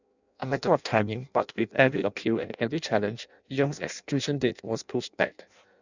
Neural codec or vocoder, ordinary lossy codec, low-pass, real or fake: codec, 16 kHz in and 24 kHz out, 0.6 kbps, FireRedTTS-2 codec; none; 7.2 kHz; fake